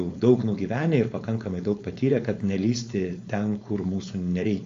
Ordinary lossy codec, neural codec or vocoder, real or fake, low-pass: AAC, 48 kbps; codec, 16 kHz, 4.8 kbps, FACodec; fake; 7.2 kHz